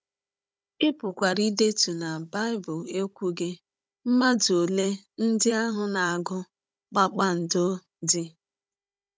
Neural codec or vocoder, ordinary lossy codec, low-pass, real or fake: codec, 16 kHz, 16 kbps, FunCodec, trained on Chinese and English, 50 frames a second; none; none; fake